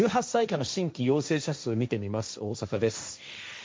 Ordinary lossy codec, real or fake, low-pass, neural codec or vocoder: none; fake; none; codec, 16 kHz, 1.1 kbps, Voila-Tokenizer